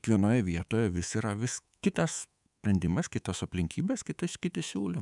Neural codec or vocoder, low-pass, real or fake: codec, 24 kHz, 3.1 kbps, DualCodec; 10.8 kHz; fake